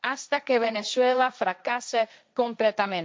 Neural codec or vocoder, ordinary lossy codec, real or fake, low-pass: codec, 16 kHz, 1.1 kbps, Voila-Tokenizer; none; fake; none